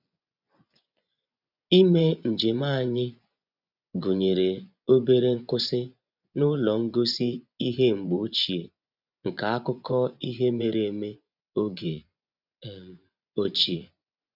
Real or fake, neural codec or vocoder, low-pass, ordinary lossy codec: fake; vocoder, 24 kHz, 100 mel bands, Vocos; 5.4 kHz; none